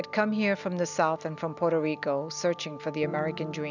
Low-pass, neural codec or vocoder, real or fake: 7.2 kHz; none; real